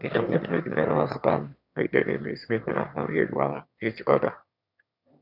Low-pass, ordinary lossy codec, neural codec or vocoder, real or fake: 5.4 kHz; none; autoencoder, 22.05 kHz, a latent of 192 numbers a frame, VITS, trained on one speaker; fake